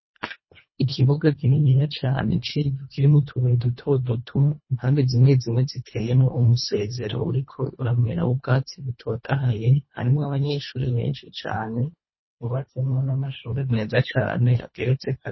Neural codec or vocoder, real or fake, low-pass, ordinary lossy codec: codec, 24 kHz, 1.5 kbps, HILCodec; fake; 7.2 kHz; MP3, 24 kbps